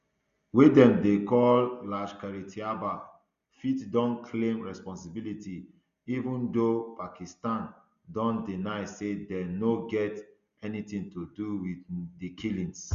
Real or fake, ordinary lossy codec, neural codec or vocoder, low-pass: real; Opus, 64 kbps; none; 7.2 kHz